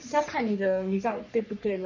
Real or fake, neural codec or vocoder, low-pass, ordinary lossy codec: fake; codec, 44.1 kHz, 3.4 kbps, Pupu-Codec; 7.2 kHz; none